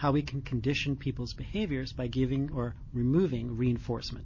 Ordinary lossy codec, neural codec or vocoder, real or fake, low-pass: MP3, 32 kbps; none; real; 7.2 kHz